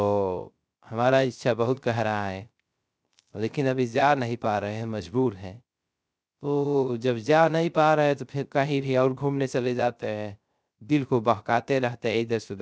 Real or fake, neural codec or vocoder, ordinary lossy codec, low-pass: fake; codec, 16 kHz, 0.3 kbps, FocalCodec; none; none